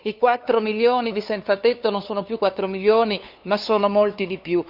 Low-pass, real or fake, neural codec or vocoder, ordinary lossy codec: 5.4 kHz; fake; codec, 16 kHz, 2 kbps, FunCodec, trained on LibriTTS, 25 frames a second; Opus, 64 kbps